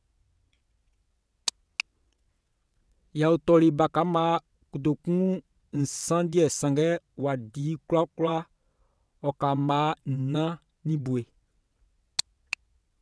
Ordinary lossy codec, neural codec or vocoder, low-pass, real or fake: none; vocoder, 22.05 kHz, 80 mel bands, WaveNeXt; none; fake